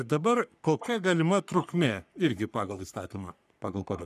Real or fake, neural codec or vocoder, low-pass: fake; codec, 44.1 kHz, 3.4 kbps, Pupu-Codec; 14.4 kHz